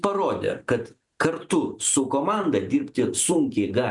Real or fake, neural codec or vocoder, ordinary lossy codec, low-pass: real; none; MP3, 96 kbps; 10.8 kHz